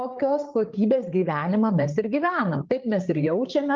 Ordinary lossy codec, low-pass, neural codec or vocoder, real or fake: Opus, 32 kbps; 7.2 kHz; codec, 16 kHz, 8 kbps, FreqCodec, larger model; fake